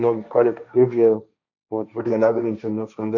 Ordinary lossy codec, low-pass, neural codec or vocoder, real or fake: none; 7.2 kHz; codec, 16 kHz, 1.1 kbps, Voila-Tokenizer; fake